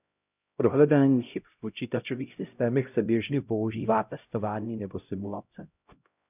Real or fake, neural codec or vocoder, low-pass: fake; codec, 16 kHz, 0.5 kbps, X-Codec, HuBERT features, trained on LibriSpeech; 3.6 kHz